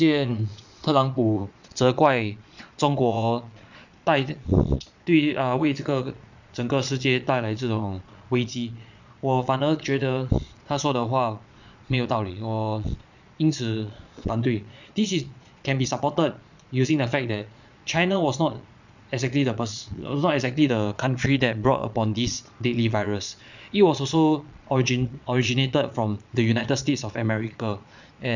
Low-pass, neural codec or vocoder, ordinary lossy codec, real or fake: 7.2 kHz; vocoder, 22.05 kHz, 80 mel bands, Vocos; none; fake